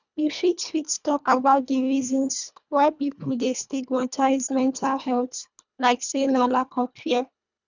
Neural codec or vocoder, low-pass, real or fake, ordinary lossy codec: codec, 24 kHz, 1.5 kbps, HILCodec; 7.2 kHz; fake; none